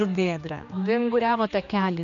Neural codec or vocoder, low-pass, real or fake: codec, 16 kHz, 2 kbps, X-Codec, HuBERT features, trained on general audio; 7.2 kHz; fake